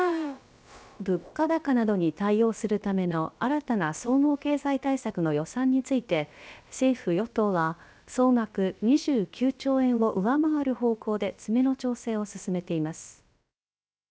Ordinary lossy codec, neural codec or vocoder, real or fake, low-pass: none; codec, 16 kHz, about 1 kbps, DyCAST, with the encoder's durations; fake; none